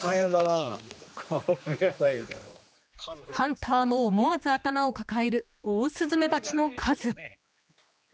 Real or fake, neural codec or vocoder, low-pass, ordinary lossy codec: fake; codec, 16 kHz, 2 kbps, X-Codec, HuBERT features, trained on general audio; none; none